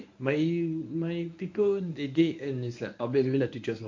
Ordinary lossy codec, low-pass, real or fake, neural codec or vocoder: MP3, 48 kbps; 7.2 kHz; fake; codec, 24 kHz, 0.9 kbps, WavTokenizer, medium speech release version 1